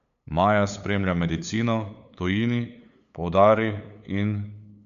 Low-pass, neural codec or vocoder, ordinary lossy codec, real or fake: 7.2 kHz; codec, 16 kHz, 8 kbps, FunCodec, trained on LibriTTS, 25 frames a second; none; fake